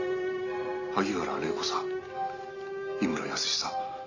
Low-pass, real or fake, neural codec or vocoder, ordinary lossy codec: 7.2 kHz; real; none; none